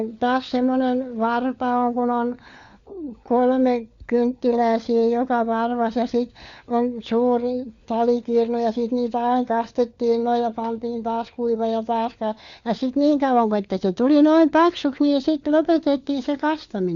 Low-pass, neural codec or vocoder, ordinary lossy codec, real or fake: 7.2 kHz; codec, 16 kHz, 4 kbps, FunCodec, trained on LibriTTS, 50 frames a second; Opus, 64 kbps; fake